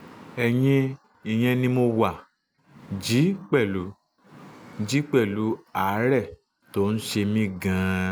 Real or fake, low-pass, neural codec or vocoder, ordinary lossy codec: real; none; none; none